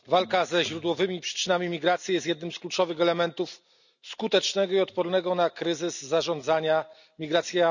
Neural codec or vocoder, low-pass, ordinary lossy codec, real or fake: none; 7.2 kHz; none; real